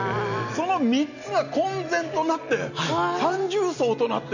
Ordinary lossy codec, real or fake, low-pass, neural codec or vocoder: none; real; 7.2 kHz; none